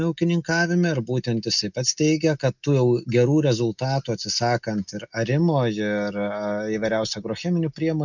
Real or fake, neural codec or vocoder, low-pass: real; none; 7.2 kHz